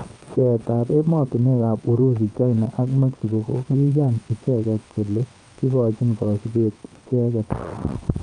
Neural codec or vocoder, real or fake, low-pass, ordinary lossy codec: vocoder, 22.05 kHz, 80 mel bands, WaveNeXt; fake; 9.9 kHz; Opus, 32 kbps